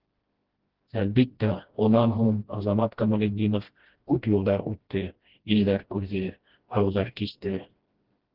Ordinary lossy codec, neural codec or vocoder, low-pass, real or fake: Opus, 16 kbps; codec, 16 kHz, 1 kbps, FreqCodec, smaller model; 5.4 kHz; fake